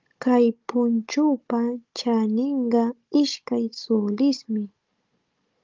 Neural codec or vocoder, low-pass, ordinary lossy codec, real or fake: codec, 16 kHz, 16 kbps, FunCodec, trained on Chinese and English, 50 frames a second; 7.2 kHz; Opus, 24 kbps; fake